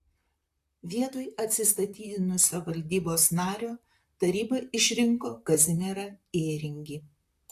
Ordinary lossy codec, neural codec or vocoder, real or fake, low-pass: AAC, 64 kbps; vocoder, 44.1 kHz, 128 mel bands, Pupu-Vocoder; fake; 14.4 kHz